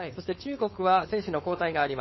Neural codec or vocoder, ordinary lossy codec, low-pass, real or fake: codec, 16 kHz, 4.8 kbps, FACodec; MP3, 24 kbps; 7.2 kHz; fake